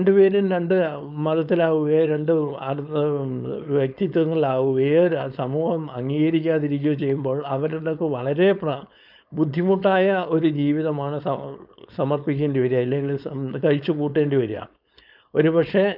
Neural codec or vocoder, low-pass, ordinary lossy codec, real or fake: codec, 16 kHz, 4.8 kbps, FACodec; 5.4 kHz; none; fake